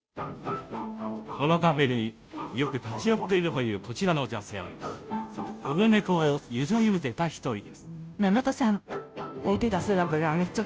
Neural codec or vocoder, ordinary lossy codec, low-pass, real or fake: codec, 16 kHz, 0.5 kbps, FunCodec, trained on Chinese and English, 25 frames a second; none; none; fake